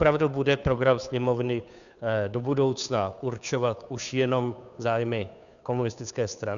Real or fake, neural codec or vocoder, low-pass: fake; codec, 16 kHz, 2 kbps, FunCodec, trained on Chinese and English, 25 frames a second; 7.2 kHz